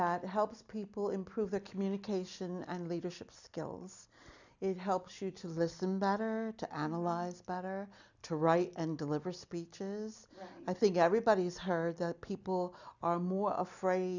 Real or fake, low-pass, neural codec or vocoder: real; 7.2 kHz; none